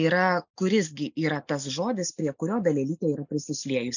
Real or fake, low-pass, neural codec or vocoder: real; 7.2 kHz; none